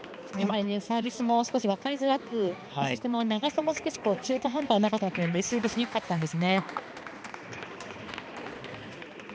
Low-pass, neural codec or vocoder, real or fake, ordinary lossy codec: none; codec, 16 kHz, 2 kbps, X-Codec, HuBERT features, trained on balanced general audio; fake; none